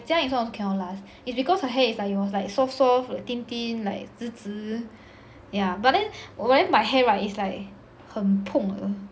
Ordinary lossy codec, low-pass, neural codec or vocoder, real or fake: none; none; none; real